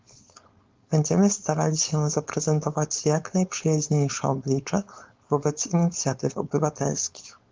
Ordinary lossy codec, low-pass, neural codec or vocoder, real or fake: Opus, 24 kbps; 7.2 kHz; codec, 16 kHz, 4.8 kbps, FACodec; fake